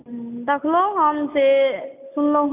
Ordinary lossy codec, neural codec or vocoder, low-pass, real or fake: AAC, 32 kbps; none; 3.6 kHz; real